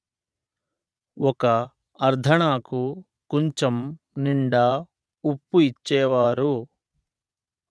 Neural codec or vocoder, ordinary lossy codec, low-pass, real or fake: vocoder, 22.05 kHz, 80 mel bands, Vocos; none; none; fake